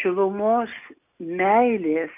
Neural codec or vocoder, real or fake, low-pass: none; real; 3.6 kHz